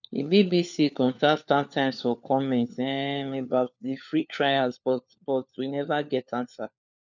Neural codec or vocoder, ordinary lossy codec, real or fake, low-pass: codec, 16 kHz, 4 kbps, FunCodec, trained on LibriTTS, 50 frames a second; none; fake; 7.2 kHz